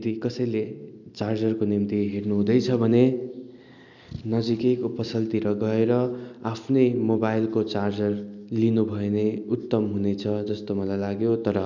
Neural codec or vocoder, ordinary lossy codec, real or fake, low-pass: none; none; real; 7.2 kHz